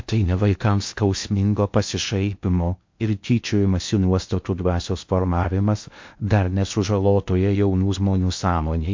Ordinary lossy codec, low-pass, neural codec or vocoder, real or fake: MP3, 48 kbps; 7.2 kHz; codec, 16 kHz in and 24 kHz out, 0.6 kbps, FocalCodec, streaming, 2048 codes; fake